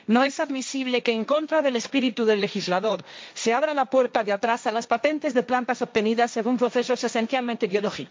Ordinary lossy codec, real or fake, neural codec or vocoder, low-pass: none; fake; codec, 16 kHz, 1.1 kbps, Voila-Tokenizer; none